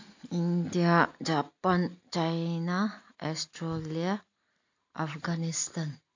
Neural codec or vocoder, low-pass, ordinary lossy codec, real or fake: none; 7.2 kHz; AAC, 48 kbps; real